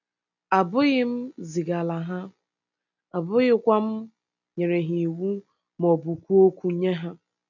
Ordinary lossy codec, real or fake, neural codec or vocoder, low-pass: none; real; none; 7.2 kHz